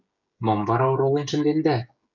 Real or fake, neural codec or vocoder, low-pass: fake; codec, 16 kHz, 16 kbps, FreqCodec, smaller model; 7.2 kHz